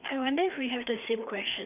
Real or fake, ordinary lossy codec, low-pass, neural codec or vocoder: fake; none; 3.6 kHz; codec, 16 kHz, 4 kbps, FreqCodec, larger model